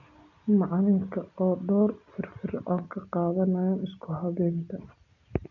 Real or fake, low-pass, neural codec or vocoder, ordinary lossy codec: real; 7.2 kHz; none; none